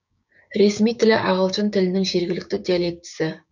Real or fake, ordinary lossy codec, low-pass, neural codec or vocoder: fake; none; 7.2 kHz; codec, 16 kHz, 6 kbps, DAC